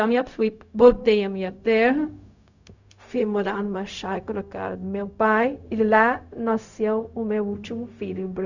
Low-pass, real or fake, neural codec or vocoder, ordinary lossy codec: 7.2 kHz; fake; codec, 16 kHz, 0.4 kbps, LongCat-Audio-Codec; none